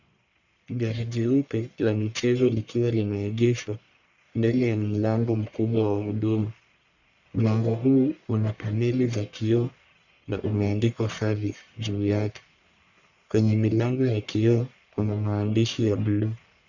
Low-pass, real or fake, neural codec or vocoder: 7.2 kHz; fake; codec, 44.1 kHz, 1.7 kbps, Pupu-Codec